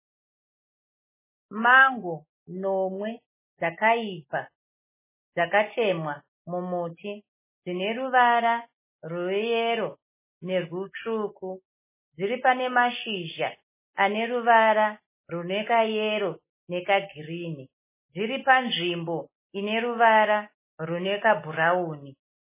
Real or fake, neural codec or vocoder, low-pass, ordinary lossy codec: real; none; 3.6 kHz; MP3, 16 kbps